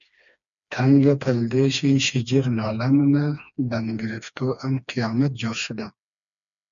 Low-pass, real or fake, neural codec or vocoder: 7.2 kHz; fake; codec, 16 kHz, 2 kbps, FreqCodec, smaller model